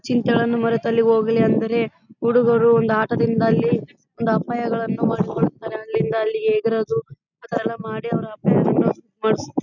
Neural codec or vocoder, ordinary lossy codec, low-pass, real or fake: none; none; 7.2 kHz; real